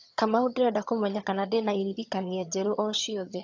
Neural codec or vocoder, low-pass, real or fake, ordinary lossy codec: vocoder, 22.05 kHz, 80 mel bands, HiFi-GAN; 7.2 kHz; fake; AAC, 48 kbps